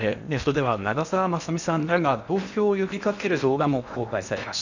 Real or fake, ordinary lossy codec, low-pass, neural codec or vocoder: fake; none; 7.2 kHz; codec, 16 kHz in and 24 kHz out, 0.8 kbps, FocalCodec, streaming, 65536 codes